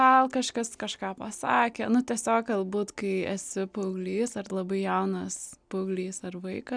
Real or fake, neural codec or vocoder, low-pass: real; none; 9.9 kHz